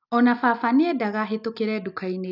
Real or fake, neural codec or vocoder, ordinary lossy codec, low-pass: real; none; none; 5.4 kHz